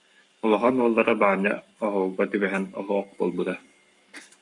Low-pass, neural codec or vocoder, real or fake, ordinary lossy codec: 10.8 kHz; codec, 44.1 kHz, 7.8 kbps, Pupu-Codec; fake; MP3, 64 kbps